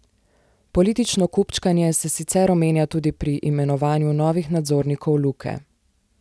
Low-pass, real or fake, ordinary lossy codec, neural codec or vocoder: none; real; none; none